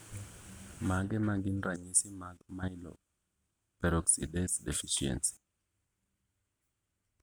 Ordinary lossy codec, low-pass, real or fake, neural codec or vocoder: none; none; real; none